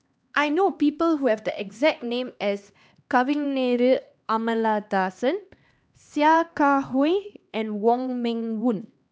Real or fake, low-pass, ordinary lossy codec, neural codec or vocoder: fake; none; none; codec, 16 kHz, 2 kbps, X-Codec, HuBERT features, trained on LibriSpeech